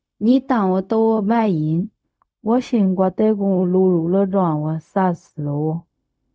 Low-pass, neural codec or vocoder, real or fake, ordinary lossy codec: none; codec, 16 kHz, 0.4 kbps, LongCat-Audio-Codec; fake; none